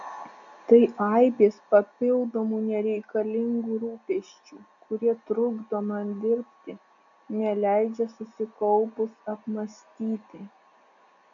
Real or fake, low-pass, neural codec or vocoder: real; 7.2 kHz; none